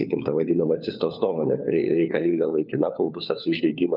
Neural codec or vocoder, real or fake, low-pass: codec, 16 kHz, 4 kbps, FunCodec, trained on LibriTTS, 50 frames a second; fake; 5.4 kHz